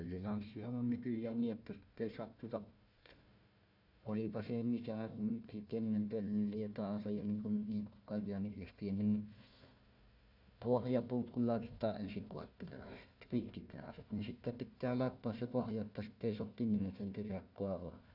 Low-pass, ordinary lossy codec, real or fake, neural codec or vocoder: 5.4 kHz; none; fake; codec, 16 kHz, 1 kbps, FunCodec, trained on Chinese and English, 50 frames a second